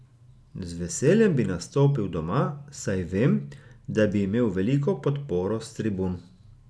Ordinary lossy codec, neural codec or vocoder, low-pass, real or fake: none; none; none; real